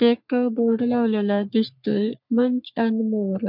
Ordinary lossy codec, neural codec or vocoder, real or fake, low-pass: none; codec, 44.1 kHz, 3.4 kbps, Pupu-Codec; fake; 5.4 kHz